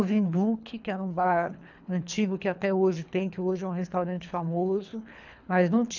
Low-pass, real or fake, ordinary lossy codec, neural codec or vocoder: 7.2 kHz; fake; none; codec, 24 kHz, 3 kbps, HILCodec